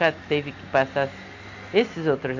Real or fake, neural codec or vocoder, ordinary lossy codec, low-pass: real; none; MP3, 48 kbps; 7.2 kHz